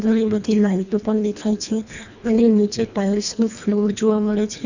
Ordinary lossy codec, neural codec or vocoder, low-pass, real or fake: none; codec, 24 kHz, 1.5 kbps, HILCodec; 7.2 kHz; fake